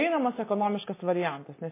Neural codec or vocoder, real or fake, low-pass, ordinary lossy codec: none; real; 3.6 kHz; MP3, 16 kbps